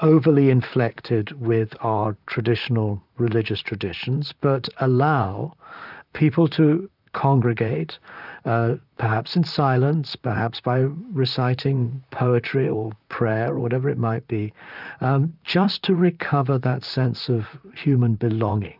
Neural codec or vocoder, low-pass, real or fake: vocoder, 44.1 kHz, 128 mel bands, Pupu-Vocoder; 5.4 kHz; fake